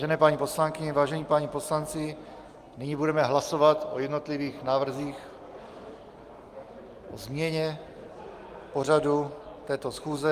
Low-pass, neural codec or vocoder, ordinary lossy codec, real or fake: 14.4 kHz; none; Opus, 32 kbps; real